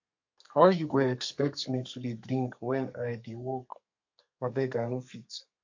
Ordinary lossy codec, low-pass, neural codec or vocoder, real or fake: MP3, 48 kbps; 7.2 kHz; codec, 32 kHz, 1.9 kbps, SNAC; fake